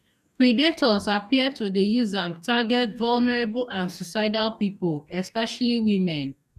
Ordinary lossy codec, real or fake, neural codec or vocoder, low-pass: none; fake; codec, 44.1 kHz, 2.6 kbps, DAC; 14.4 kHz